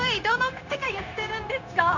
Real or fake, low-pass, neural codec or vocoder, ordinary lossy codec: fake; 7.2 kHz; codec, 16 kHz, 0.9 kbps, LongCat-Audio-Codec; none